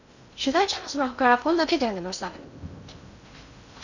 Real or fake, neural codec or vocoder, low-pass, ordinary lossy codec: fake; codec, 16 kHz in and 24 kHz out, 0.6 kbps, FocalCodec, streaming, 2048 codes; 7.2 kHz; none